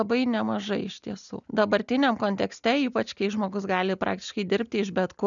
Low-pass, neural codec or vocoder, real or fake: 7.2 kHz; none; real